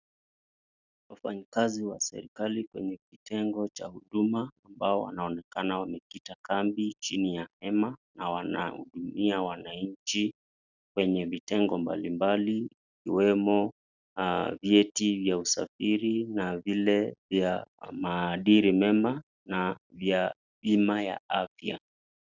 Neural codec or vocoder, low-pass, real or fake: none; 7.2 kHz; real